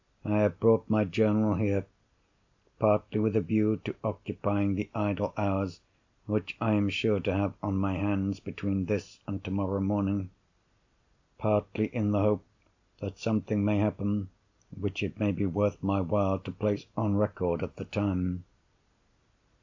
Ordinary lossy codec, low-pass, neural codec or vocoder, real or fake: AAC, 48 kbps; 7.2 kHz; none; real